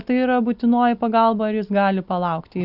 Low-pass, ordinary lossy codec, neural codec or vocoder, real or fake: 5.4 kHz; Opus, 64 kbps; none; real